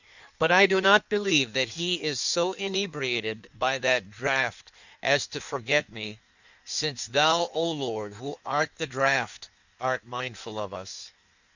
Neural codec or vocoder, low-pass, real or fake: codec, 16 kHz in and 24 kHz out, 1.1 kbps, FireRedTTS-2 codec; 7.2 kHz; fake